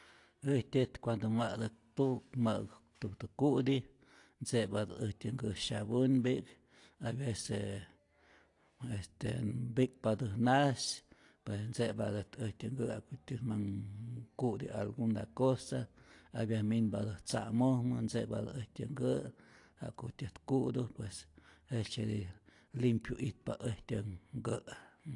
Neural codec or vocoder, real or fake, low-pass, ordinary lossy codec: none; real; 10.8 kHz; AAC, 48 kbps